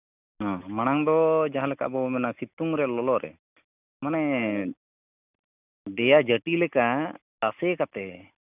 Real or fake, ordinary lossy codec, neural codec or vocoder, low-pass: real; none; none; 3.6 kHz